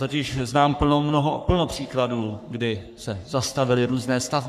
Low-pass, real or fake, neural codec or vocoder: 14.4 kHz; fake; codec, 44.1 kHz, 3.4 kbps, Pupu-Codec